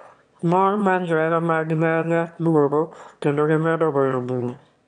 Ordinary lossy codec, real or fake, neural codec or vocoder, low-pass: none; fake; autoencoder, 22.05 kHz, a latent of 192 numbers a frame, VITS, trained on one speaker; 9.9 kHz